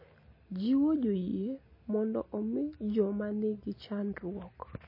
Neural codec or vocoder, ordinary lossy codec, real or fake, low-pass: none; MP3, 24 kbps; real; 5.4 kHz